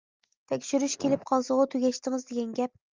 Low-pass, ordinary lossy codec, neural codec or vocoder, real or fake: 7.2 kHz; Opus, 24 kbps; none; real